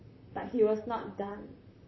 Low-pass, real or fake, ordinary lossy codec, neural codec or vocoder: 7.2 kHz; fake; MP3, 24 kbps; vocoder, 44.1 kHz, 128 mel bands, Pupu-Vocoder